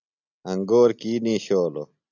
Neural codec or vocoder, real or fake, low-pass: none; real; 7.2 kHz